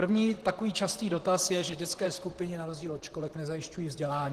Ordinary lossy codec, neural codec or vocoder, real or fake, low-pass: Opus, 16 kbps; vocoder, 44.1 kHz, 128 mel bands, Pupu-Vocoder; fake; 14.4 kHz